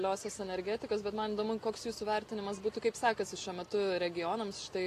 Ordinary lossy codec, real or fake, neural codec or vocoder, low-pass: AAC, 48 kbps; real; none; 14.4 kHz